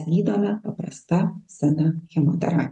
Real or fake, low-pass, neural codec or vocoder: real; 10.8 kHz; none